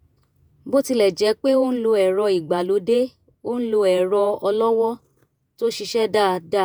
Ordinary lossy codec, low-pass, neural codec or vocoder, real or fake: none; none; vocoder, 48 kHz, 128 mel bands, Vocos; fake